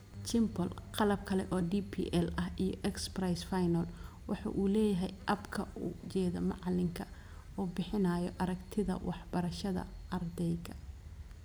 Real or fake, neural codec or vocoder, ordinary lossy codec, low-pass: real; none; none; none